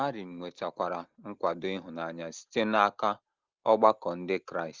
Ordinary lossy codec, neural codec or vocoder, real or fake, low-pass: Opus, 32 kbps; none; real; 7.2 kHz